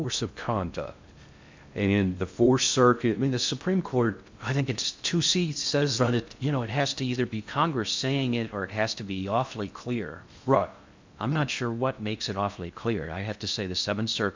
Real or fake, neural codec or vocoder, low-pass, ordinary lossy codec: fake; codec, 16 kHz in and 24 kHz out, 0.6 kbps, FocalCodec, streaming, 2048 codes; 7.2 kHz; MP3, 64 kbps